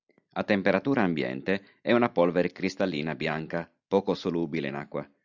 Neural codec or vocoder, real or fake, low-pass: none; real; 7.2 kHz